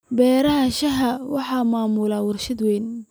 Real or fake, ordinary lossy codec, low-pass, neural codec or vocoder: real; none; none; none